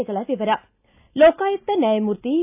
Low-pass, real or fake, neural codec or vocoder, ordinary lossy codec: 3.6 kHz; real; none; AAC, 32 kbps